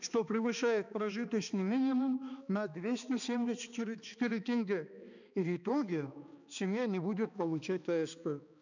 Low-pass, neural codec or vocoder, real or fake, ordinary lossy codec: 7.2 kHz; codec, 16 kHz, 2 kbps, X-Codec, HuBERT features, trained on balanced general audio; fake; none